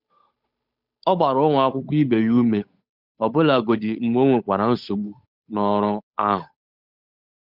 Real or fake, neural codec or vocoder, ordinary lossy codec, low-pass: fake; codec, 16 kHz, 8 kbps, FunCodec, trained on Chinese and English, 25 frames a second; none; 5.4 kHz